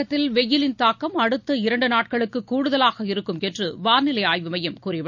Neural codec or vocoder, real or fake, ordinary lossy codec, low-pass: none; real; none; 7.2 kHz